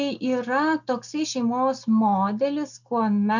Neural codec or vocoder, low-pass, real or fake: none; 7.2 kHz; real